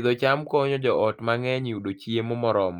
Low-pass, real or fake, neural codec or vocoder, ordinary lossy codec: 19.8 kHz; real; none; Opus, 24 kbps